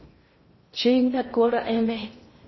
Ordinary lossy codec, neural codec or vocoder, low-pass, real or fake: MP3, 24 kbps; codec, 16 kHz in and 24 kHz out, 0.6 kbps, FocalCodec, streaming, 2048 codes; 7.2 kHz; fake